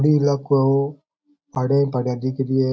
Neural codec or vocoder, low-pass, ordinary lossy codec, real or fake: none; none; none; real